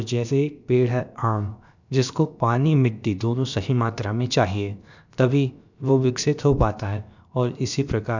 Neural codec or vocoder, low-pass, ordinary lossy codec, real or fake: codec, 16 kHz, about 1 kbps, DyCAST, with the encoder's durations; 7.2 kHz; none; fake